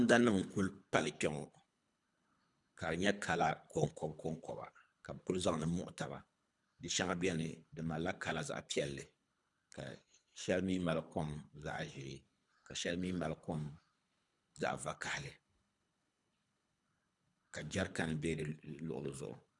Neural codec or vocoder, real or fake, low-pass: codec, 24 kHz, 3 kbps, HILCodec; fake; 10.8 kHz